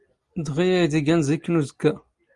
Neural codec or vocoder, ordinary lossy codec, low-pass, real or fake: none; Opus, 32 kbps; 10.8 kHz; real